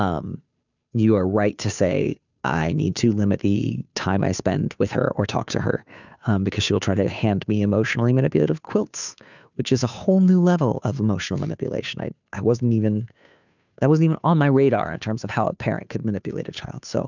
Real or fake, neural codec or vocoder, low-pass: fake; codec, 16 kHz, 2 kbps, FunCodec, trained on Chinese and English, 25 frames a second; 7.2 kHz